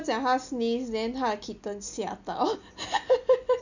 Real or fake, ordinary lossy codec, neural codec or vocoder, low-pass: real; none; none; 7.2 kHz